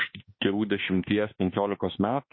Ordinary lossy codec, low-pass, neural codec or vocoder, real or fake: MP3, 24 kbps; 7.2 kHz; autoencoder, 48 kHz, 32 numbers a frame, DAC-VAE, trained on Japanese speech; fake